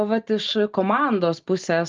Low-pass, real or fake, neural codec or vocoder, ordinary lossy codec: 7.2 kHz; real; none; Opus, 32 kbps